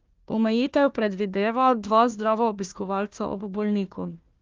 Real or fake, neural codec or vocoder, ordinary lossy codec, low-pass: fake; codec, 16 kHz, 1 kbps, FunCodec, trained on Chinese and English, 50 frames a second; Opus, 32 kbps; 7.2 kHz